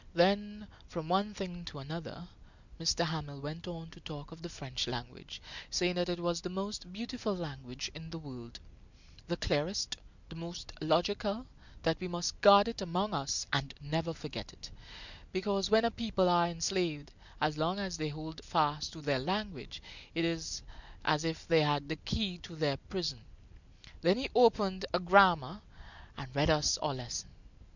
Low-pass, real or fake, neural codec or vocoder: 7.2 kHz; real; none